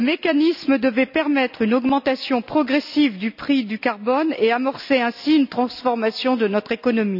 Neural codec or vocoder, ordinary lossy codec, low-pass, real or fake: none; none; 5.4 kHz; real